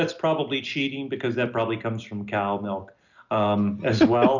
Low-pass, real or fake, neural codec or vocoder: 7.2 kHz; real; none